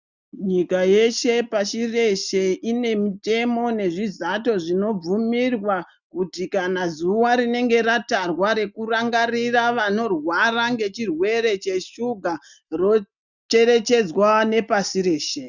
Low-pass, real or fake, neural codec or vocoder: 7.2 kHz; real; none